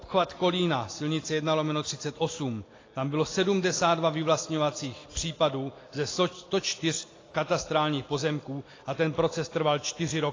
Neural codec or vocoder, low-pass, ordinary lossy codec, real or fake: none; 7.2 kHz; AAC, 32 kbps; real